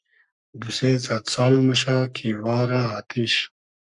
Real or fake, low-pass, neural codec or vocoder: fake; 10.8 kHz; codec, 44.1 kHz, 3.4 kbps, Pupu-Codec